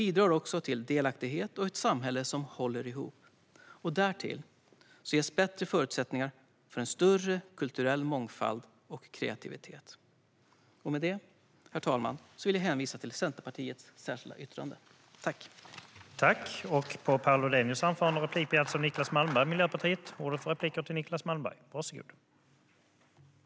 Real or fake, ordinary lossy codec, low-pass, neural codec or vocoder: real; none; none; none